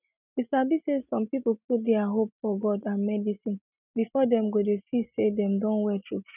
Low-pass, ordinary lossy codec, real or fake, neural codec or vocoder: 3.6 kHz; none; real; none